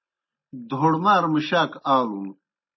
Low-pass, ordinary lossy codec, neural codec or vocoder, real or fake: 7.2 kHz; MP3, 24 kbps; none; real